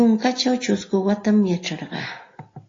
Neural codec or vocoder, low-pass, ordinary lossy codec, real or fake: none; 7.2 kHz; AAC, 32 kbps; real